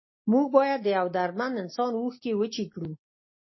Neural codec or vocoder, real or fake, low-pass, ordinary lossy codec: none; real; 7.2 kHz; MP3, 24 kbps